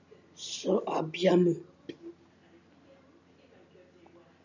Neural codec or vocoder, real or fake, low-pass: none; real; 7.2 kHz